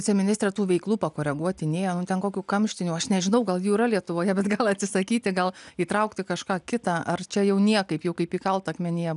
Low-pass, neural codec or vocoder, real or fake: 10.8 kHz; none; real